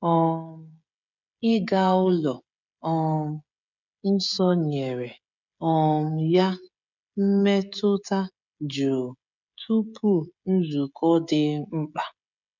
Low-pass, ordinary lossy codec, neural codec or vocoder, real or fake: 7.2 kHz; none; codec, 16 kHz, 16 kbps, FreqCodec, smaller model; fake